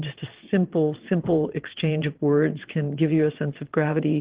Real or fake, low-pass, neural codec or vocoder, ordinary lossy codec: fake; 3.6 kHz; vocoder, 22.05 kHz, 80 mel bands, WaveNeXt; Opus, 16 kbps